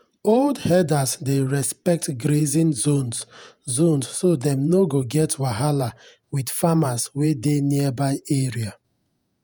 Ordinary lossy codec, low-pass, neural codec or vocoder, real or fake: none; none; vocoder, 48 kHz, 128 mel bands, Vocos; fake